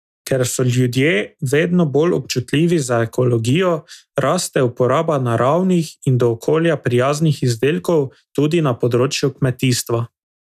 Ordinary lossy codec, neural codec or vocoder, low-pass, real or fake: none; none; 14.4 kHz; real